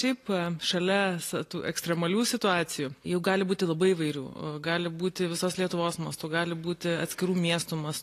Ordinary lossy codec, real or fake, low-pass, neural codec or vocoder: AAC, 48 kbps; real; 14.4 kHz; none